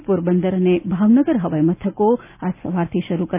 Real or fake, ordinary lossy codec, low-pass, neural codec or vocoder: real; none; 3.6 kHz; none